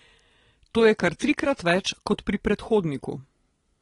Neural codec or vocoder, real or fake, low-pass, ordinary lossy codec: none; real; 10.8 kHz; AAC, 32 kbps